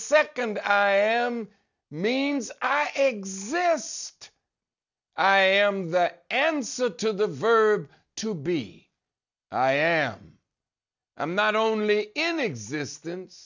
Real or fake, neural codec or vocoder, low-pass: real; none; 7.2 kHz